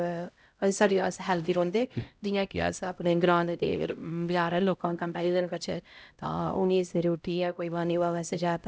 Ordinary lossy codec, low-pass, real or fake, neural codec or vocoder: none; none; fake; codec, 16 kHz, 0.5 kbps, X-Codec, HuBERT features, trained on LibriSpeech